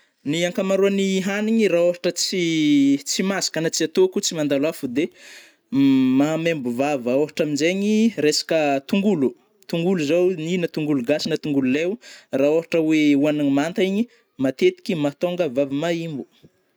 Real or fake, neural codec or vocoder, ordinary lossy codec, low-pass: real; none; none; none